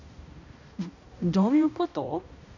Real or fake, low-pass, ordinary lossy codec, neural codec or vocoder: fake; 7.2 kHz; none; codec, 16 kHz, 1 kbps, X-Codec, HuBERT features, trained on balanced general audio